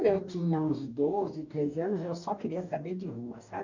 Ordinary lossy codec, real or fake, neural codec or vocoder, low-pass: none; fake; codec, 44.1 kHz, 2.6 kbps, DAC; 7.2 kHz